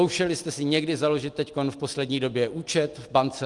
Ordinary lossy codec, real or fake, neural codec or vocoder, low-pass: Opus, 32 kbps; real; none; 10.8 kHz